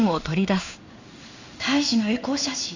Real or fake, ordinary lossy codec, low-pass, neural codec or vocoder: fake; Opus, 64 kbps; 7.2 kHz; codec, 16 kHz in and 24 kHz out, 1 kbps, XY-Tokenizer